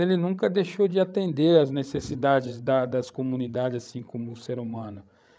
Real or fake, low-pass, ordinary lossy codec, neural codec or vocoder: fake; none; none; codec, 16 kHz, 8 kbps, FreqCodec, larger model